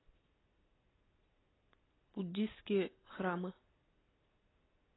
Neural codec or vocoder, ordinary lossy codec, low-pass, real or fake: none; AAC, 16 kbps; 7.2 kHz; real